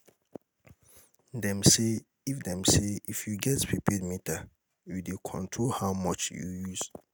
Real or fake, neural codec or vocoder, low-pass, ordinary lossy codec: real; none; none; none